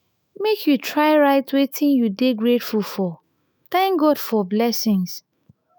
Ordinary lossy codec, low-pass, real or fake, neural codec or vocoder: none; none; fake; autoencoder, 48 kHz, 128 numbers a frame, DAC-VAE, trained on Japanese speech